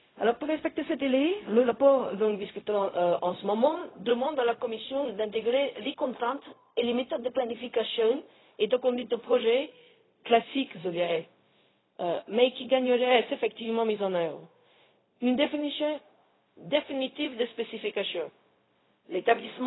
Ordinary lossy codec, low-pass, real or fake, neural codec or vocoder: AAC, 16 kbps; 7.2 kHz; fake; codec, 16 kHz, 0.4 kbps, LongCat-Audio-Codec